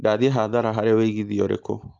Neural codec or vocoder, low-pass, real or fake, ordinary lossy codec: none; 7.2 kHz; real; Opus, 24 kbps